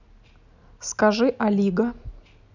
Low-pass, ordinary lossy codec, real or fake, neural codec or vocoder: 7.2 kHz; none; real; none